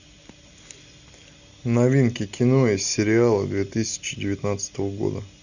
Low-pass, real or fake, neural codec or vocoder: 7.2 kHz; real; none